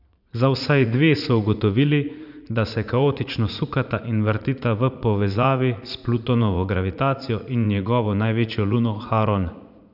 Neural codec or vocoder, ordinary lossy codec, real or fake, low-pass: vocoder, 44.1 kHz, 80 mel bands, Vocos; none; fake; 5.4 kHz